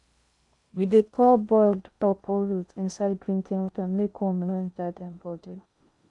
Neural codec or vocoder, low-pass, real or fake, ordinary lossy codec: codec, 16 kHz in and 24 kHz out, 0.6 kbps, FocalCodec, streaming, 4096 codes; 10.8 kHz; fake; MP3, 96 kbps